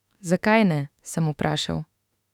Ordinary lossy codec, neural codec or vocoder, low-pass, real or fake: none; autoencoder, 48 kHz, 32 numbers a frame, DAC-VAE, trained on Japanese speech; 19.8 kHz; fake